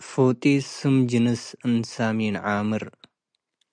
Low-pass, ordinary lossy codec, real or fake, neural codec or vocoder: 9.9 kHz; MP3, 96 kbps; real; none